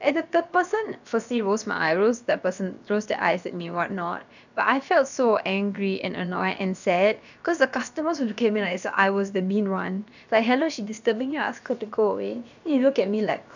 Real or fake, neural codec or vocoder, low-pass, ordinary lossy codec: fake; codec, 16 kHz, about 1 kbps, DyCAST, with the encoder's durations; 7.2 kHz; none